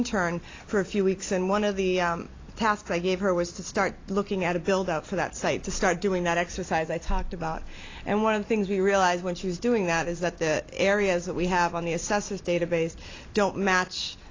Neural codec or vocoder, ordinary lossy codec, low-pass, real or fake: vocoder, 44.1 kHz, 128 mel bands every 256 samples, BigVGAN v2; AAC, 32 kbps; 7.2 kHz; fake